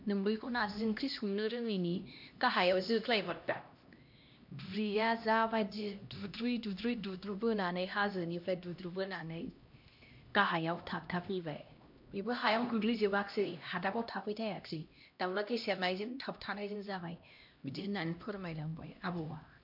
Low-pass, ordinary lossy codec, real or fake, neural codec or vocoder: 5.4 kHz; MP3, 48 kbps; fake; codec, 16 kHz, 1 kbps, X-Codec, HuBERT features, trained on LibriSpeech